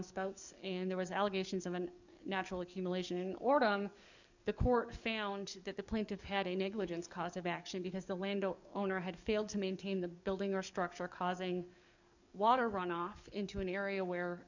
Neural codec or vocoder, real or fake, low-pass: codec, 44.1 kHz, 7.8 kbps, DAC; fake; 7.2 kHz